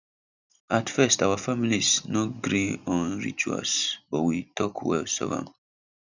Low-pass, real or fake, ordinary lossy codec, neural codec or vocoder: 7.2 kHz; real; none; none